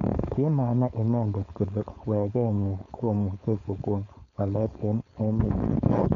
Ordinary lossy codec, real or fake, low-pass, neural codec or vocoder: none; fake; 7.2 kHz; codec, 16 kHz, 4.8 kbps, FACodec